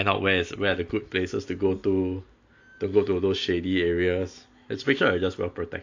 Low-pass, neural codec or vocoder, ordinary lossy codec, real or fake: 7.2 kHz; none; AAC, 48 kbps; real